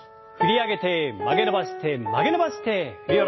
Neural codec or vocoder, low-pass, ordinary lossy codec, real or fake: none; 7.2 kHz; MP3, 24 kbps; real